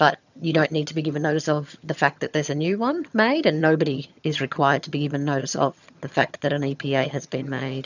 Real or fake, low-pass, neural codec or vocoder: fake; 7.2 kHz; vocoder, 22.05 kHz, 80 mel bands, HiFi-GAN